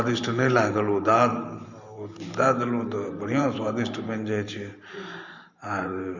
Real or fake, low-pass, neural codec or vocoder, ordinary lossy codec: fake; 7.2 kHz; vocoder, 44.1 kHz, 128 mel bands every 512 samples, BigVGAN v2; Opus, 64 kbps